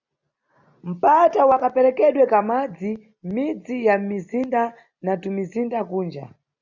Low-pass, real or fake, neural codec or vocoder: 7.2 kHz; real; none